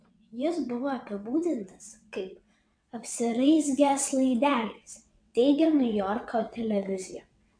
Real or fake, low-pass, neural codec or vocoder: fake; 9.9 kHz; vocoder, 22.05 kHz, 80 mel bands, WaveNeXt